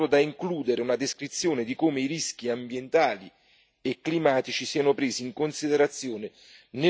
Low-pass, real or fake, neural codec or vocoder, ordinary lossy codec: none; real; none; none